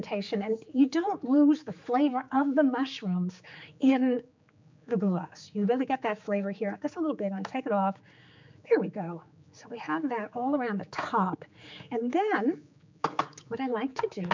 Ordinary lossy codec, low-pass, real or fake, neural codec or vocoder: MP3, 64 kbps; 7.2 kHz; fake; codec, 16 kHz, 4 kbps, X-Codec, HuBERT features, trained on general audio